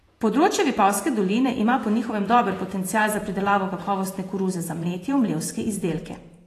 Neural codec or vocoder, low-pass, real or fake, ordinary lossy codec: vocoder, 48 kHz, 128 mel bands, Vocos; 14.4 kHz; fake; AAC, 48 kbps